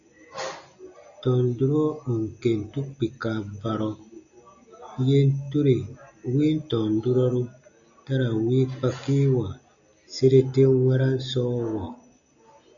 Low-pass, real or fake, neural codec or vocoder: 7.2 kHz; real; none